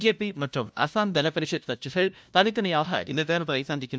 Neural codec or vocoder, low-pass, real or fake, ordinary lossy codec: codec, 16 kHz, 0.5 kbps, FunCodec, trained on LibriTTS, 25 frames a second; none; fake; none